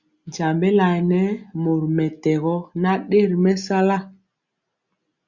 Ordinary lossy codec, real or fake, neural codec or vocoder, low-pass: Opus, 64 kbps; real; none; 7.2 kHz